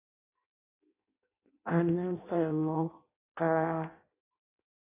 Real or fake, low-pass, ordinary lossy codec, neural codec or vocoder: fake; 3.6 kHz; AAC, 24 kbps; codec, 16 kHz in and 24 kHz out, 0.6 kbps, FireRedTTS-2 codec